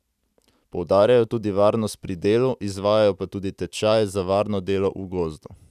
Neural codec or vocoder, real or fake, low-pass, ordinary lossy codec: none; real; 14.4 kHz; none